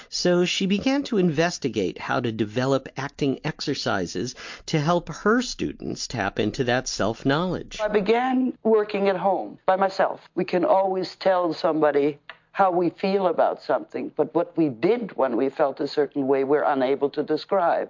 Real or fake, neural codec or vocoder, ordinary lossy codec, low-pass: real; none; MP3, 48 kbps; 7.2 kHz